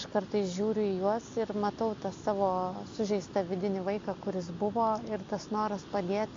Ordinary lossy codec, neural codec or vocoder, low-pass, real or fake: AAC, 48 kbps; none; 7.2 kHz; real